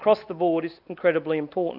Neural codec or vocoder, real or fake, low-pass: none; real; 5.4 kHz